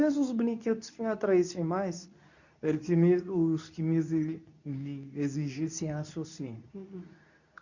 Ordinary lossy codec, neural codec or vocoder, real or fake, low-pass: none; codec, 24 kHz, 0.9 kbps, WavTokenizer, medium speech release version 2; fake; 7.2 kHz